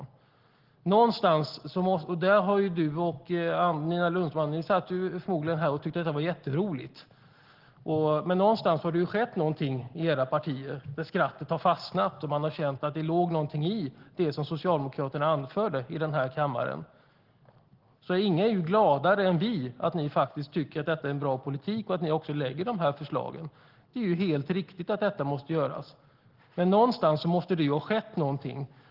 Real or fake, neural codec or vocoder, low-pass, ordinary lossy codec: real; none; 5.4 kHz; Opus, 16 kbps